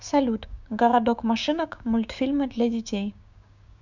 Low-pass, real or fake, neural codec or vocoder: 7.2 kHz; fake; codec, 16 kHz, 6 kbps, DAC